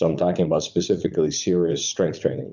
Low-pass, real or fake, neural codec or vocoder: 7.2 kHz; fake; vocoder, 44.1 kHz, 80 mel bands, Vocos